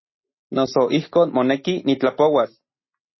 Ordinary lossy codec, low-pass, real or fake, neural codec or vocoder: MP3, 24 kbps; 7.2 kHz; real; none